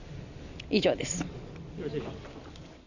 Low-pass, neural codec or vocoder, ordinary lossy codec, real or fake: 7.2 kHz; none; none; real